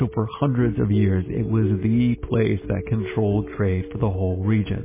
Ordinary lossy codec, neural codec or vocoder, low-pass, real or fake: MP3, 16 kbps; none; 3.6 kHz; real